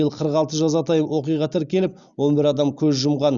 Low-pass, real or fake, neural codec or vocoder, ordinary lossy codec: 7.2 kHz; real; none; Opus, 64 kbps